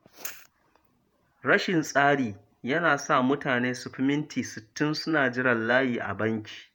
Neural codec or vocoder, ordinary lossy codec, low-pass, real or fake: vocoder, 48 kHz, 128 mel bands, Vocos; none; none; fake